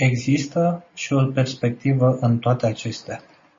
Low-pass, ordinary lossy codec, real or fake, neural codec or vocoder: 7.2 kHz; MP3, 32 kbps; real; none